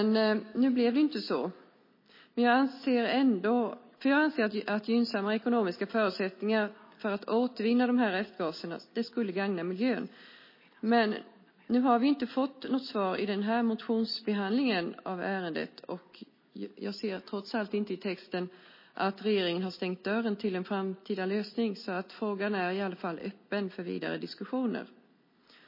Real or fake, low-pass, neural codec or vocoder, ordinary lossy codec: real; 5.4 kHz; none; MP3, 24 kbps